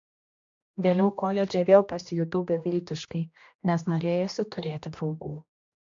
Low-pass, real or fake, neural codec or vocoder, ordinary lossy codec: 7.2 kHz; fake; codec, 16 kHz, 1 kbps, X-Codec, HuBERT features, trained on general audio; MP3, 64 kbps